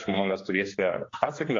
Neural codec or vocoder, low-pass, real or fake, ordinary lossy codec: codec, 16 kHz, 2 kbps, X-Codec, HuBERT features, trained on general audio; 7.2 kHz; fake; AAC, 32 kbps